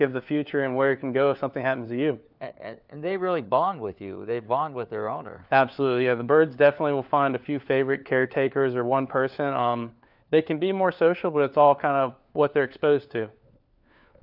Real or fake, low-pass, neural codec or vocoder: fake; 5.4 kHz; codec, 16 kHz, 4 kbps, FunCodec, trained on LibriTTS, 50 frames a second